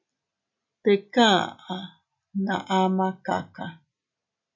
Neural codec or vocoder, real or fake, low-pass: none; real; 7.2 kHz